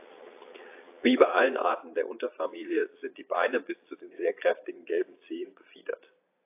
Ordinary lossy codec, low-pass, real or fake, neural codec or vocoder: AAC, 24 kbps; 3.6 kHz; fake; vocoder, 22.05 kHz, 80 mel bands, Vocos